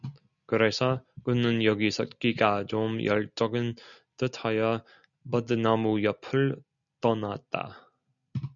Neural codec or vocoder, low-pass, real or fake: none; 7.2 kHz; real